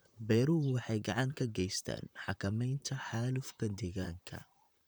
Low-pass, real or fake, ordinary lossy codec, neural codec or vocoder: none; fake; none; vocoder, 44.1 kHz, 128 mel bands, Pupu-Vocoder